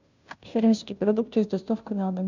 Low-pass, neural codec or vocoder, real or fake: 7.2 kHz; codec, 16 kHz, 0.5 kbps, FunCodec, trained on Chinese and English, 25 frames a second; fake